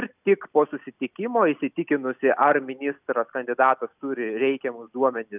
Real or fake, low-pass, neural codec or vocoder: real; 3.6 kHz; none